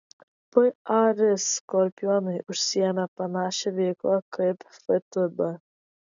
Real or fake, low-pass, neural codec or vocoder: real; 7.2 kHz; none